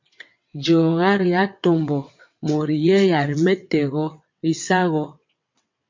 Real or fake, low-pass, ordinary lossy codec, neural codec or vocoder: fake; 7.2 kHz; MP3, 48 kbps; vocoder, 22.05 kHz, 80 mel bands, WaveNeXt